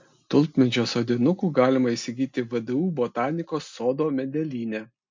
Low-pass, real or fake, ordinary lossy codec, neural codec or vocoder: 7.2 kHz; real; MP3, 48 kbps; none